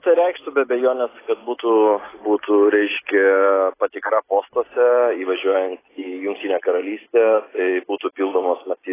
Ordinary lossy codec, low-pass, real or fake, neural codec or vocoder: AAC, 16 kbps; 3.6 kHz; real; none